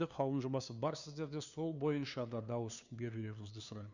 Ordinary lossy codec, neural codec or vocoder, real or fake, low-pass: Opus, 64 kbps; codec, 16 kHz, 2 kbps, FunCodec, trained on LibriTTS, 25 frames a second; fake; 7.2 kHz